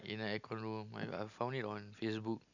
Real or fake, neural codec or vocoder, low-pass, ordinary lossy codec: real; none; 7.2 kHz; none